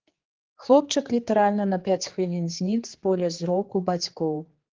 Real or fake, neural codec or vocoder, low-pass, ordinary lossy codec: fake; codec, 16 kHz, 4 kbps, X-Codec, HuBERT features, trained on general audio; 7.2 kHz; Opus, 16 kbps